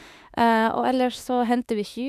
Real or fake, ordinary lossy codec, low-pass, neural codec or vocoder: fake; none; 14.4 kHz; autoencoder, 48 kHz, 32 numbers a frame, DAC-VAE, trained on Japanese speech